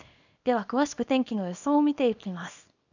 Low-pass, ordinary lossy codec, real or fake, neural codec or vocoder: 7.2 kHz; none; fake; codec, 24 kHz, 0.9 kbps, WavTokenizer, small release